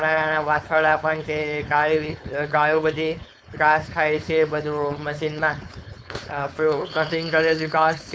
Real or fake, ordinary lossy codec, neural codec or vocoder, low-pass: fake; none; codec, 16 kHz, 4.8 kbps, FACodec; none